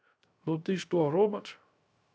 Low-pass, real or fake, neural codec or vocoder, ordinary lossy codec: none; fake; codec, 16 kHz, 0.3 kbps, FocalCodec; none